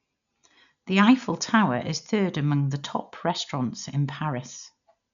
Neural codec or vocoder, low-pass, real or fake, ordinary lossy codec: none; 7.2 kHz; real; none